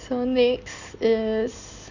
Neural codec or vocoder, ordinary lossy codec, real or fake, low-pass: none; none; real; 7.2 kHz